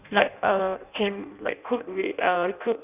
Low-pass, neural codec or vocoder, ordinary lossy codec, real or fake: 3.6 kHz; codec, 16 kHz in and 24 kHz out, 0.6 kbps, FireRedTTS-2 codec; none; fake